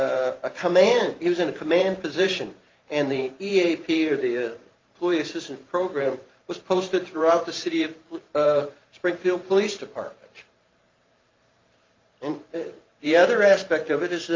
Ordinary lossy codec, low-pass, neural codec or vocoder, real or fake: Opus, 24 kbps; 7.2 kHz; vocoder, 44.1 kHz, 128 mel bands every 512 samples, BigVGAN v2; fake